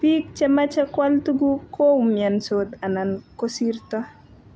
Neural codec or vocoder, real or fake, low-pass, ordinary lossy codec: none; real; none; none